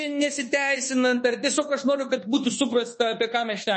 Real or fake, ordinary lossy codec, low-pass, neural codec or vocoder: fake; MP3, 32 kbps; 10.8 kHz; codec, 24 kHz, 1.2 kbps, DualCodec